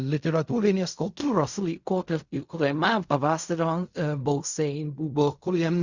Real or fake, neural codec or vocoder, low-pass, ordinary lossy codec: fake; codec, 16 kHz in and 24 kHz out, 0.4 kbps, LongCat-Audio-Codec, fine tuned four codebook decoder; 7.2 kHz; Opus, 64 kbps